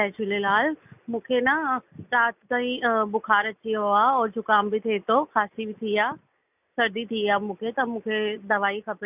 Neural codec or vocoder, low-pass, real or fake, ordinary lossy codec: none; 3.6 kHz; real; none